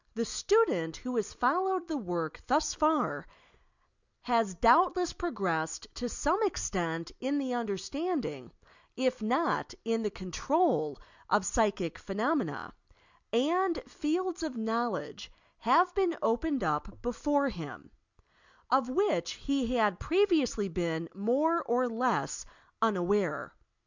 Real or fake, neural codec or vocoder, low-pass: real; none; 7.2 kHz